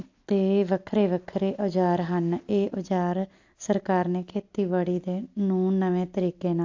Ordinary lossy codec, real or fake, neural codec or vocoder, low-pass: AAC, 48 kbps; real; none; 7.2 kHz